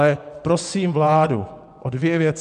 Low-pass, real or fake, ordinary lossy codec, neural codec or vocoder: 10.8 kHz; fake; MP3, 96 kbps; vocoder, 24 kHz, 100 mel bands, Vocos